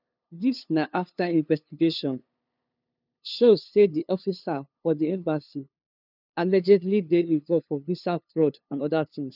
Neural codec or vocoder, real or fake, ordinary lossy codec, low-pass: codec, 16 kHz, 2 kbps, FunCodec, trained on LibriTTS, 25 frames a second; fake; none; 5.4 kHz